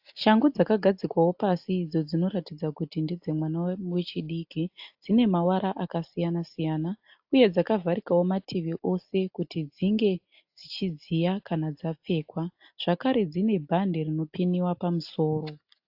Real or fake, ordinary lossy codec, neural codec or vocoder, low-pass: real; AAC, 48 kbps; none; 5.4 kHz